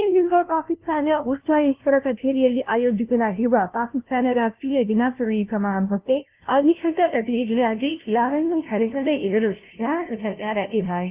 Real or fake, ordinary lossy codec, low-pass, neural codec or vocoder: fake; Opus, 32 kbps; 3.6 kHz; codec, 16 kHz, 0.5 kbps, FunCodec, trained on LibriTTS, 25 frames a second